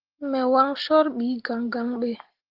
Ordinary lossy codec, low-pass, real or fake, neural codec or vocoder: Opus, 16 kbps; 5.4 kHz; real; none